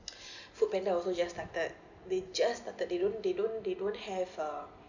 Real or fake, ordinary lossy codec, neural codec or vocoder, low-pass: real; none; none; 7.2 kHz